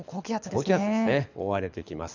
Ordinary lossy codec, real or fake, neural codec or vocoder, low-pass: none; fake; codec, 24 kHz, 6 kbps, HILCodec; 7.2 kHz